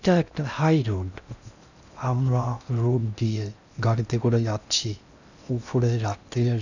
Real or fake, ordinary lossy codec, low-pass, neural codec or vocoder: fake; none; 7.2 kHz; codec, 16 kHz in and 24 kHz out, 0.6 kbps, FocalCodec, streaming, 4096 codes